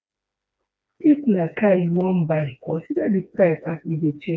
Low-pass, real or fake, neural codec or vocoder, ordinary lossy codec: none; fake; codec, 16 kHz, 2 kbps, FreqCodec, smaller model; none